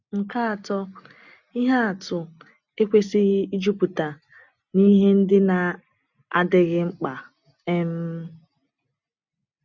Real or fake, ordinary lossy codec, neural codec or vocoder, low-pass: real; none; none; 7.2 kHz